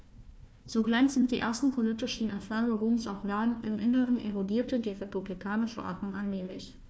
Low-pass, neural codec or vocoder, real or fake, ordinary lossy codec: none; codec, 16 kHz, 1 kbps, FunCodec, trained on Chinese and English, 50 frames a second; fake; none